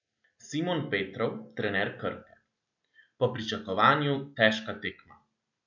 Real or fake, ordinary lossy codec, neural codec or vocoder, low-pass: real; none; none; 7.2 kHz